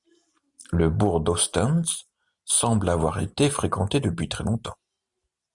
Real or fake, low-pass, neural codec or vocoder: real; 10.8 kHz; none